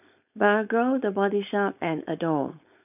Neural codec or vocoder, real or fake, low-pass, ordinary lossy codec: codec, 16 kHz, 4.8 kbps, FACodec; fake; 3.6 kHz; none